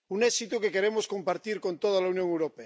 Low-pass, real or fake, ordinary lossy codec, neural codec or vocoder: none; real; none; none